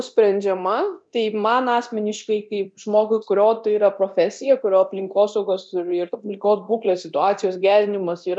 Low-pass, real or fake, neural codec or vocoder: 9.9 kHz; fake; codec, 24 kHz, 0.9 kbps, DualCodec